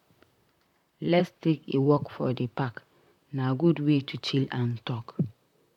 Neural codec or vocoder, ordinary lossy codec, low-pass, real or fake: vocoder, 44.1 kHz, 128 mel bands, Pupu-Vocoder; none; 19.8 kHz; fake